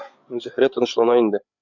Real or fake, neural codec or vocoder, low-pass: fake; codec, 16 kHz, 8 kbps, FreqCodec, larger model; 7.2 kHz